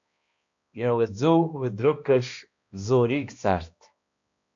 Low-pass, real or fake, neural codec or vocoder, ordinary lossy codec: 7.2 kHz; fake; codec, 16 kHz, 1 kbps, X-Codec, HuBERT features, trained on balanced general audio; AAC, 64 kbps